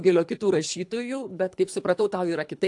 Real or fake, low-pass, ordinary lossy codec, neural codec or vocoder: fake; 10.8 kHz; AAC, 64 kbps; codec, 24 kHz, 3 kbps, HILCodec